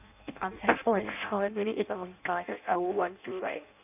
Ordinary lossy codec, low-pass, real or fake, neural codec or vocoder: none; 3.6 kHz; fake; codec, 16 kHz in and 24 kHz out, 0.6 kbps, FireRedTTS-2 codec